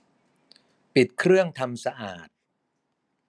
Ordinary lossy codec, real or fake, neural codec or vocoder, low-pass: none; real; none; none